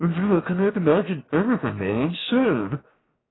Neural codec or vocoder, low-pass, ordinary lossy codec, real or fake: codec, 16 kHz, 0.7 kbps, FocalCodec; 7.2 kHz; AAC, 16 kbps; fake